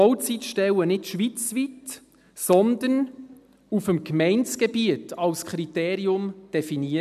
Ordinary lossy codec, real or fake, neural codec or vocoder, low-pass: none; real; none; 14.4 kHz